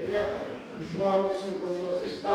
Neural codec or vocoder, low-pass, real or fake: codec, 44.1 kHz, 2.6 kbps, DAC; 19.8 kHz; fake